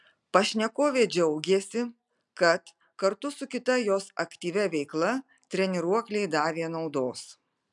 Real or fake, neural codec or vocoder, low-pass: real; none; 10.8 kHz